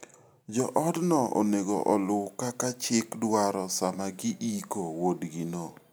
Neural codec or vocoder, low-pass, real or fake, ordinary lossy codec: none; none; real; none